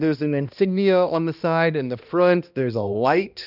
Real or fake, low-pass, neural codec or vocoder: fake; 5.4 kHz; codec, 16 kHz, 1 kbps, X-Codec, HuBERT features, trained on balanced general audio